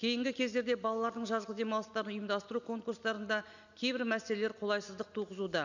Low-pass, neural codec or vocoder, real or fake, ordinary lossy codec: 7.2 kHz; none; real; none